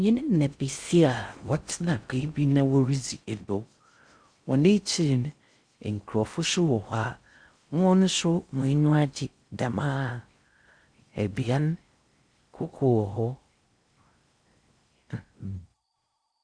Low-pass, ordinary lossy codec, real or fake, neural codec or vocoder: 9.9 kHz; Opus, 64 kbps; fake; codec, 16 kHz in and 24 kHz out, 0.6 kbps, FocalCodec, streaming, 4096 codes